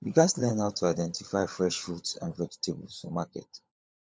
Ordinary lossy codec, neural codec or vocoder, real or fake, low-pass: none; codec, 16 kHz, 16 kbps, FunCodec, trained on LibriTTS, 50 frames a second; fake; none